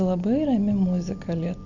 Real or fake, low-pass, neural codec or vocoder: real; 7.2 kHz; none